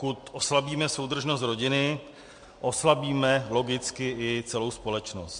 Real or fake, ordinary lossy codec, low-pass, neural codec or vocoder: real; MP3, 48 kbps; 9.9 kHz; none